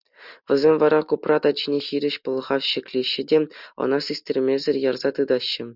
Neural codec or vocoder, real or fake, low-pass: none; real; 5.4 kHz